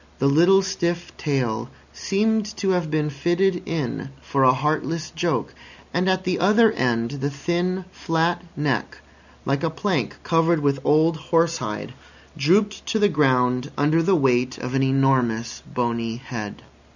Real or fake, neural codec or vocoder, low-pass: real; none; 7.2 kHz